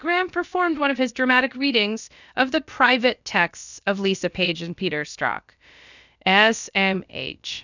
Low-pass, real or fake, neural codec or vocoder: 7.2 kHz; fake; codec, 16 kHz, about 1 kbps, DyCAST, with the encoder's durations